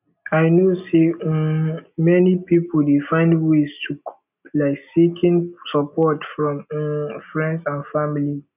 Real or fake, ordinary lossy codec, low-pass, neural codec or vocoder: real; none; 3.6 kHz; none